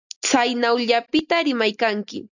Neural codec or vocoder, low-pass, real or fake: none; 7.2 kHz; real